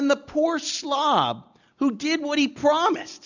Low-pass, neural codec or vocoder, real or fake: 7.2 kHz; none; real